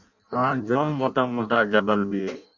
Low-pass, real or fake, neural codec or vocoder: 7.2 kHz; fake; codec, 16 kHz in and 24 kHz out, 0.6 kbps, FireRedTTS-2 codec